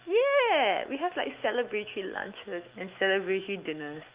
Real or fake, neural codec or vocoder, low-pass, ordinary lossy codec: fake; autoencoder, 48 kHz, 128 numbers a frame, DAC-VAE, trained on Japanese speech; 3.6 kHz; Opus, 32 kbps